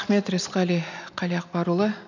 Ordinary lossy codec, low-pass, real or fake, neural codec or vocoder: none; 7.2 kHz; real; none